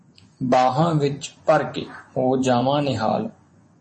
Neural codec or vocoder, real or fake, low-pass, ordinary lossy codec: vocoder, 24 kHz, 100 mel bands, Vocos; fake; 10.8 kHz; MP3, 32 kbps